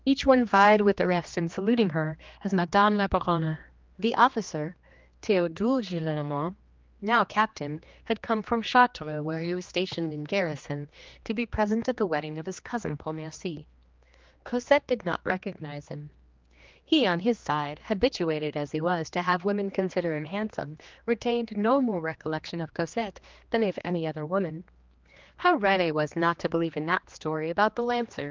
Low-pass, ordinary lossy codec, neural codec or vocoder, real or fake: 7.2 kHz; Opus, 32 kbps; codec, 16 kHz, 2 kbps, X-Codec, HuBERT features, trained on general audio; fake